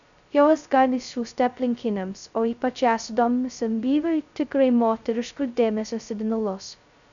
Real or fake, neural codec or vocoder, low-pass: fake; codec, 16 kHz, 0.2 kbps, FocalCodec; 7.2 kHz